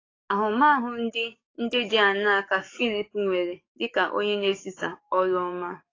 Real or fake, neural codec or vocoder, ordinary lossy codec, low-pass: fake; codec, 44.1 kHz, 7.8 kbps, DAC; AAC, 32 kbps; 7.2 kHz